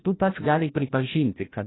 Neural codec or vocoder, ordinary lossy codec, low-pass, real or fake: codec, 16 kHz, 1 kbps, FreqCodec, larger model; AAC, 16 kbps; 7.2 kHz; fake